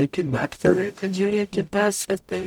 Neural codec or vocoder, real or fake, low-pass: codec, 44.1 kHz, 0.9 kbps, DAC; fake; 19.8 kHz